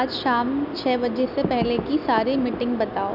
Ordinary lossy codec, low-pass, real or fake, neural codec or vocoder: none; 5.4 kHz; real; none